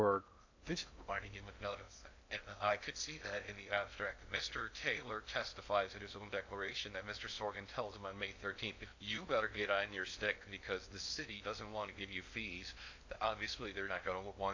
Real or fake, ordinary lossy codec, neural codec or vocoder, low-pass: fake; AAC, 48 kbps; codec, 16 kHz in and 24 kHz out, 0.6 kbps, FocalCodec, streaming, 2048 codes; 7.2 kHz